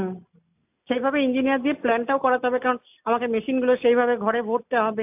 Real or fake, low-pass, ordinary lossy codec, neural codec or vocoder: real; 3.6 kHz; AAC, 32 kbps; none